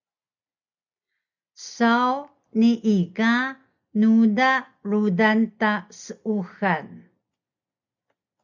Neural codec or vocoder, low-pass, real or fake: none; 7.2 kHz; real